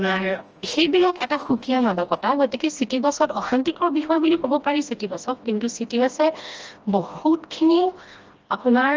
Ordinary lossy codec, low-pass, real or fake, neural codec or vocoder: Opus, 24 kbps; 7.2 kHz; fake; codec, 16 kHz, 1 kbps, FreqCodec, smaller model